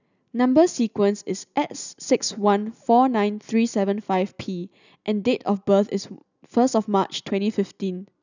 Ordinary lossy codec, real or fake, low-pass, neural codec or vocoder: none; real; 7.2 kHz; none